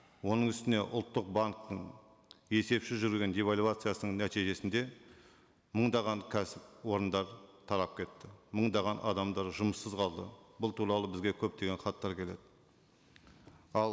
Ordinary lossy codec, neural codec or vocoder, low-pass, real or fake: none; none; none; real